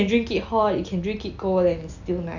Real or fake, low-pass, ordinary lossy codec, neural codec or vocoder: real; 7.2 kHz; none; none